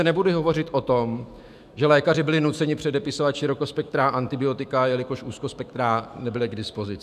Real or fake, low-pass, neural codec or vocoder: fake; 14.4 kHz; autoencoder, 48 kHz, 128 numbers a frame, DAC-VAE, trained on Japanese speech